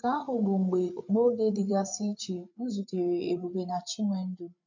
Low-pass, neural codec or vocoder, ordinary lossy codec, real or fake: 7.2 kHz; codec, 16 kHz, 8 kbps, FreqCodec, larger model; MP3, 64 kbps; fake